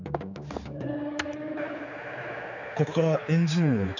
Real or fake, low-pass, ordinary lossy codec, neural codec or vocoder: fake; 7.2 kHz; none; codec, 16 kHz, 4 kbps, X-Codec, HuBERT features, trained on general audio